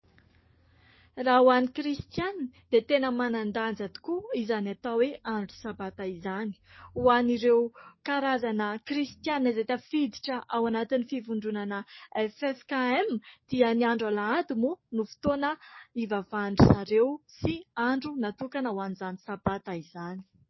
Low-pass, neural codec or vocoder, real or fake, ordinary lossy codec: 7.2 kHz; codec, 44.1 kHz, 7.8 kbps, DAC; fake; MP3, 24 kbps